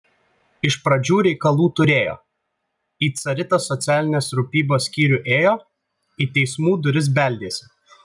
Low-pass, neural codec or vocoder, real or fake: 10.8 kHz; none; real